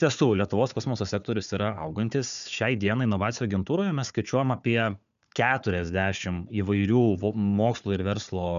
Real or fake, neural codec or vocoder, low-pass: fake; codec, 16 kHz, 4 kbps, FunCodec, trained on Chinese and English, 50 frames a second; 7.2 kHz